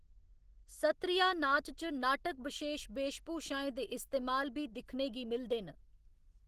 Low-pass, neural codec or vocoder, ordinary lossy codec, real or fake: 14.4 kHz; none; Opus, 16 kbps; real